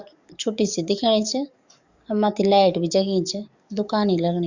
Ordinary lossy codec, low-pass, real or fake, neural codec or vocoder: Opus, 64 kbps; 7.2 kHz; fake; codec, 44.1 kHz, 7.8 kbps, DAC